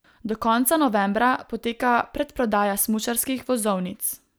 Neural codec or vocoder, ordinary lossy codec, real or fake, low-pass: none; none; real; none